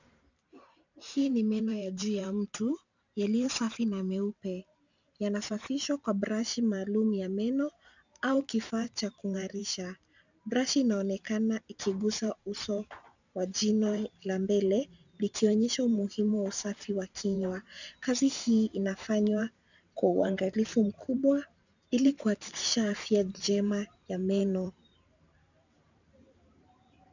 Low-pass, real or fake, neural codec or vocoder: 7.2 kHz; fake; vocoder, 44.1 kHz, 128 mel bands every 512 samples, BigVGAN v2